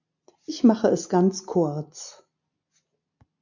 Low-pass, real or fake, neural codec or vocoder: 7.2 kHz; real; none